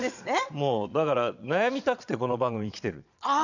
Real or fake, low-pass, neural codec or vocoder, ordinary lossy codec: fake; 7.2 kHz; vocoder, 22.05 kHz, 80 mel bands, Vocos; none